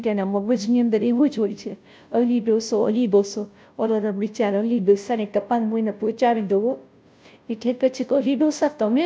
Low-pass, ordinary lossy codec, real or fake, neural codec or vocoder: none; none; fake; codec, 16 kHz, 0.5 kbps, FunCodec, trained on Chinese and English, 25 frames a second